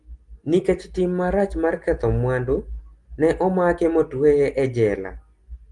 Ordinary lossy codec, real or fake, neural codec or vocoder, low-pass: Opus, 24 kbps; real; none; 10.8 kHz